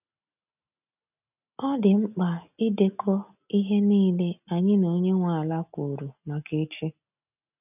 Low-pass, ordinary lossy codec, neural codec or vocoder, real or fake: 3.6 kHz; none; none; real